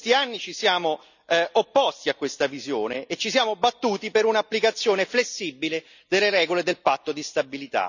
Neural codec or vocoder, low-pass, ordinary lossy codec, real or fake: none; 7.2 kHz; none; real